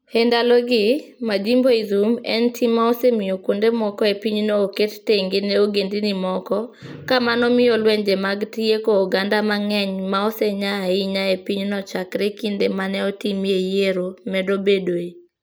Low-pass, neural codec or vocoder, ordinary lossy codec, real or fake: none; none; none; real